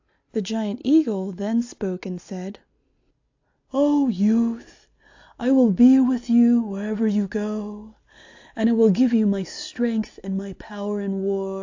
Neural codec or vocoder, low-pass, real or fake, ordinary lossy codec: none; 7.2 kHz; real; AAC, 48 kbps